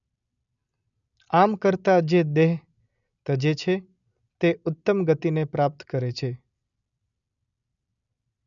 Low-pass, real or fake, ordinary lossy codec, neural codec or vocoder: 7.2 kHz; real; none; none